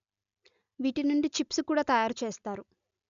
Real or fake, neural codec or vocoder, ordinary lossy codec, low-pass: real; none; none; 7.2 kHz